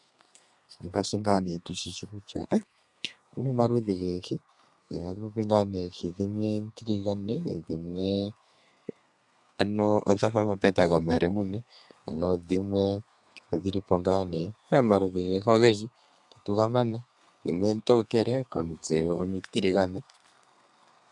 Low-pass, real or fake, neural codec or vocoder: 10.8 kHz; fake; codec, 32 kHz, 1.9 kbps, SNAC